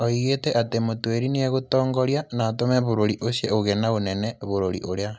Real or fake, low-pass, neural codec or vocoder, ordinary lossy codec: real; none; none; none